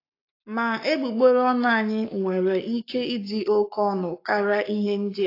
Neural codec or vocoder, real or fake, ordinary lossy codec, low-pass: codec, 44.1 kHz, 7.8 kbps, Pupu-Codec; fake; AAC, 32 kbps; 5.4 kHz